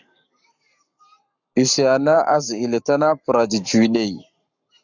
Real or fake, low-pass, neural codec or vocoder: fake; 7.2 kHz; codec, 44.1 kHz, 7.8 kbps, Pupu-Codec